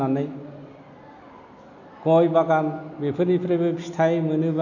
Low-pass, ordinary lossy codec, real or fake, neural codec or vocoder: 7.2 kHz; none; real; none